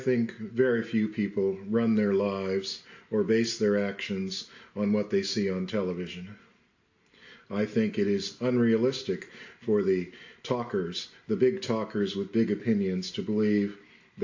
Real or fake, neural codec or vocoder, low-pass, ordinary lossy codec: real; none; 7.2 kHz; AAC, 48 kbps